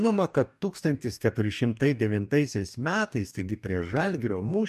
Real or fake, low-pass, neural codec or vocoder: fake; 14.4 kHz; codec, 44.1 kHz, 2.6 kbps, DAC